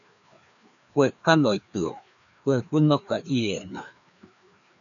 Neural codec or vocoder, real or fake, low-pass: codec, 16 kHz, 2 kbps, FreqCodec, larger model; fake; 7.2 kHz